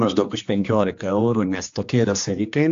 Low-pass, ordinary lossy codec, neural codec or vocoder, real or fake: 7.2 kHz; AAC, 64 kbps; codec, 16 kHz, 2 kbps, X-Codec, HuBERT features, trained on general audio; fake